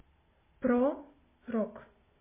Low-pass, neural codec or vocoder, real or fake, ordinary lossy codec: 3.6 kHz; none; real; MP3, 16 kbps